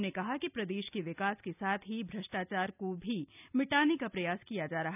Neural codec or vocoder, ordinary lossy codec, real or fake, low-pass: none; none; real; 3.6 kHz